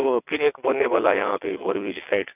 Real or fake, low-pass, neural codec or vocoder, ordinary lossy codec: fake; 3.6 kHz; vocoder, 22.05 kHz, 80 mel bands, WaveNeXt; none